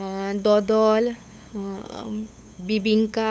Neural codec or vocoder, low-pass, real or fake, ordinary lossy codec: codec, 16 kHz, 8 kbps, FunCodec, trained on LibriTTS, 25 frames a second; none; fake; none